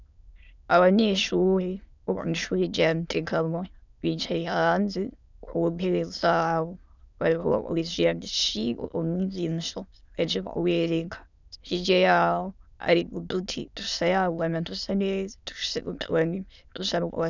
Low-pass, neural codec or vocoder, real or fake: 7.2 kHz; autoencoder, 22.05 kHz, a latent of 192 numbers a frame, VITS, trained on many speakers; fake